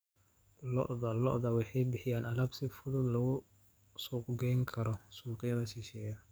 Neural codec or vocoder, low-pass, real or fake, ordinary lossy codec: codec, 44.1 kHz, 7.8 kbps, DAC; none; fake; none